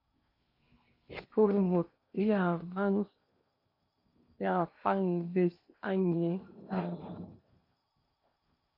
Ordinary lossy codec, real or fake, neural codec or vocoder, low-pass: MP3, 32 kbps; fake; codec, 16 kHz in and 24 kHz out, 0.8 kbps, FocalCodec, streaming, 65536 codes; 5.4 kHz